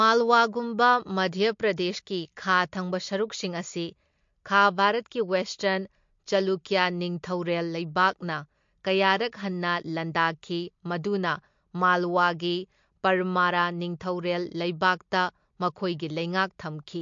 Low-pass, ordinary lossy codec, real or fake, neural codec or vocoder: 7.2 kHz; MP3, 48 kbps; real; none